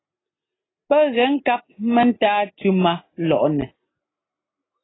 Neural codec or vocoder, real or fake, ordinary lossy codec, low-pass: none; real; AAC, 16 kbps; 7.2 kHz